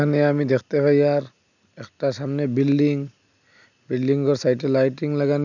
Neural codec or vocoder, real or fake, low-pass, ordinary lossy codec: none; real; 7.2 kHz; none